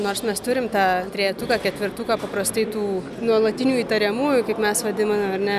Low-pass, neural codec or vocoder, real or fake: 14.4 kHz; none; real